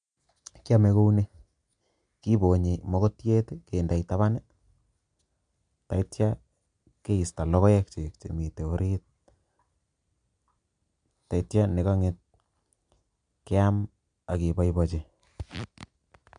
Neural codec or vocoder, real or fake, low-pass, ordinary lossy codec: none; real; 9.9 kHz; MP3, 64 kbps